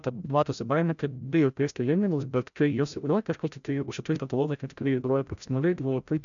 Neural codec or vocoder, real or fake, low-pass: codec, 16 kHz, 0.5 kbps, FreqCodec, larger model; fake; 7.2 kHz